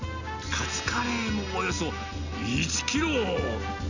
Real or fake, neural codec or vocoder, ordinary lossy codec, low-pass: real; none; none; 7.2 kHz